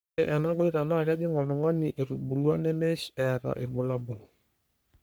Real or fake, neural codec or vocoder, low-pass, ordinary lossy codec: fake; codec, 44.1 kHz, 3.4 kbps, Pupu-Codec; none; none